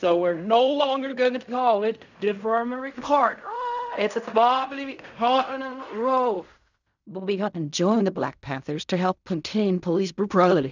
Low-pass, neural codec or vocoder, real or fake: 7.2 kHz; codec, 16 kHz in and 24 kHz out, 0.4 kbps, LongCat-Audio-Codec, fine tuned four codebook decoder; fake